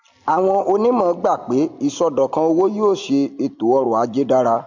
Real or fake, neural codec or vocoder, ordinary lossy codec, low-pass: real; none; MP3, 48 kbps; 7.2 kHz